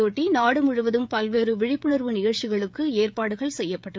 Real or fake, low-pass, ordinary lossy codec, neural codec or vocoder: fake; none; none; codec, 16 kHz, 16 kbps, FreqCodec, smaller model